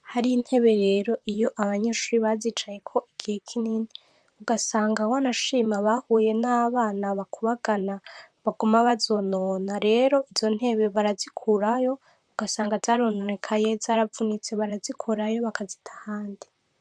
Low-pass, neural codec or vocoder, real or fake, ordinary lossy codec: 9.9 kHz; vocoder, 44.1 kHz, 128 mel bands, Pupu-Vocoder; fake; MP3, 96 kbps